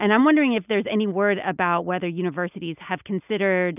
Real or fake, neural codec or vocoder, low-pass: real; none; 3.6 kHz